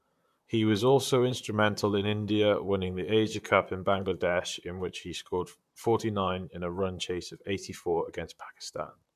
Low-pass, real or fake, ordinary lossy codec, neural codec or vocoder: 14.4 kHz; fake; MP3, 96 kbps; vocoder, 44.1 kHz, 128 mel bands, Pupu-Vocoder